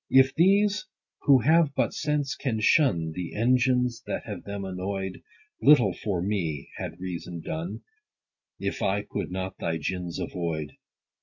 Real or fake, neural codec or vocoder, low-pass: real; none; 7.2 kHz